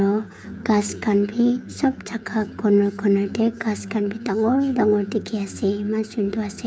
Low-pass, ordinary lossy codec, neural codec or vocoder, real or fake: none; none; codec, 16 kHz, 16 kbps, FreqCodec, smaller model; fake